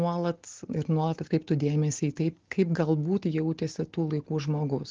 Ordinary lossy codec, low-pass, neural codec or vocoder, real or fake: Opus, 16 kbps; 7.2 kHz; none; real